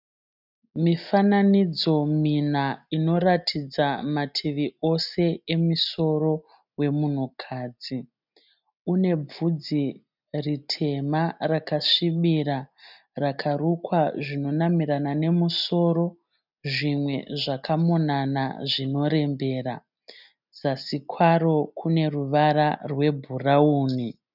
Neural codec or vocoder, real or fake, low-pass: none; real; 5.4 kHz